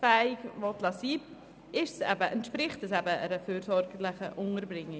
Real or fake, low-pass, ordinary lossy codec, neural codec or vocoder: real; none; none; none